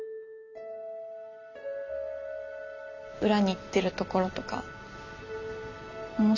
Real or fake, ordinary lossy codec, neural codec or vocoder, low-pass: real; none; none; 7.2 kHz